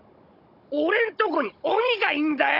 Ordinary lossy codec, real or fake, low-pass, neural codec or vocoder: none; fake; 5.4 kHz; codec, 16 kHz, 16 kbps, FunCodec, trained on Chinese and English, 50 frames a second